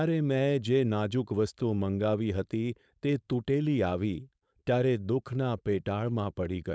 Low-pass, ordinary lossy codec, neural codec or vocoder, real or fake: none; none; codec, 16 kHz, 4.8 kbps, FACodec; fake